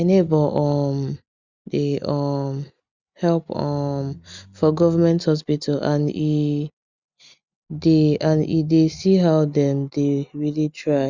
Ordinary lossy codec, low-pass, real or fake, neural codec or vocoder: Opus, 64 kbps; 7.2 kHz; real; none